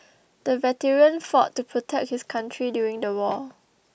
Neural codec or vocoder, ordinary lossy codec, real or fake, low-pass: none; none; real; none